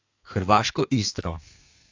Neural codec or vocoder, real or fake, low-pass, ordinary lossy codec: codec, 32 kHz, 1.9 kbps, SNAC; fake; 7.2 kHz; AAC, 48 kbps